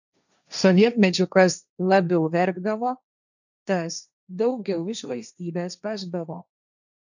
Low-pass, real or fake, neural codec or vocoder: 7.2 kHz; fake; codec, 16 kHz, 1.1 kbps, Voila-Tokenizer